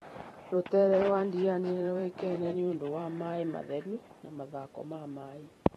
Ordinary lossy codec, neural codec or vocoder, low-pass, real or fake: AAC, 32 kbps; vocoder, 44.1 kHz, 128 mel bands every 512 samples, BigVGAN v2; 19.8 kHz; fake